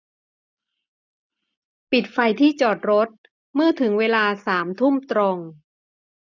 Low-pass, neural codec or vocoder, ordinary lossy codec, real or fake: 7.2 kHz; none; none; real